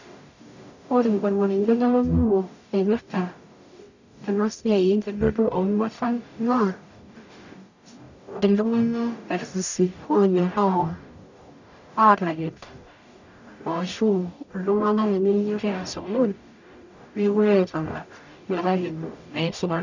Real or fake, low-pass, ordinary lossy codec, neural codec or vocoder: fake; 7.2 kHz; none; codec, 44.1 kHz, 0.9 kbps, DAC